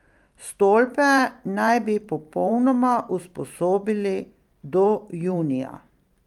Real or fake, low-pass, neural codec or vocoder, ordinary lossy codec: fake; 19.8 kHz; vocoder, 44.1 kHz, 128 mel bands every 512 samples, BigVGAN v2; Opus, 32 kbps